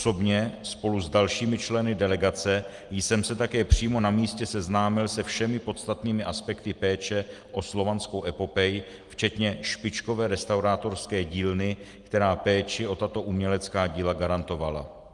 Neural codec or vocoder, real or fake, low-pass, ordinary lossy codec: none; real; 10.8 kHz; Opus, 24 kbps